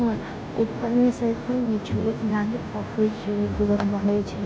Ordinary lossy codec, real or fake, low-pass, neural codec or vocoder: none; fake; none; codec, 16 kHz, 0.5 kbps, FunCodec, trained on Chinese and English, 25 frames a second